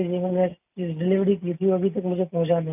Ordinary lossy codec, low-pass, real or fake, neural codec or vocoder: none; 3.6 kHz; real; none